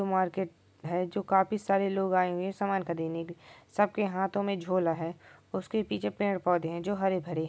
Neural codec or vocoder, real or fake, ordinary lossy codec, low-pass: none; real; none; none